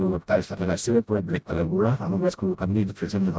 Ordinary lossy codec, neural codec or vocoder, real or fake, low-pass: none; codec, 16 kHz, 0.5 kbps, FreqCodec, smaller model; fake; none